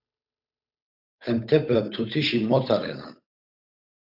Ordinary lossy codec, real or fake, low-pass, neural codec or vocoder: AAC, 48 kbps; fake; 5.4 kHz; codec, 16 kHz, 8 kbps, FunCodec, trained on Chinese and English, 25 frames a second